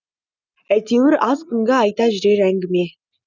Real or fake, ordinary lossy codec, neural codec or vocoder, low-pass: real; none; none; none